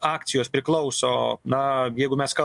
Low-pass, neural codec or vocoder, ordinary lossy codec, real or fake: 10.8 kHz; none; MP3, 64 kbps; real